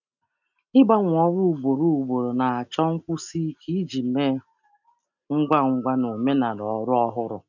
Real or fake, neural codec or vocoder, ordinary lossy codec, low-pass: real; none; none; 7.2 kHz